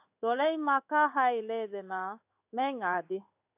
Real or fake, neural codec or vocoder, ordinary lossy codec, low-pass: fake; codec, 16 kHz in and 24 kHz out, 1 kbps, XY-Tokenizer; AAC, 32 kbps; 3.6 kHz